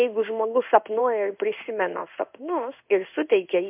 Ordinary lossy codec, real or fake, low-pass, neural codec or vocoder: MP3, 32 kbps; fake; 3.6 kHz; codec, 16 kHz in and 24 kHz out, 1 kbps, XY-Tokenizer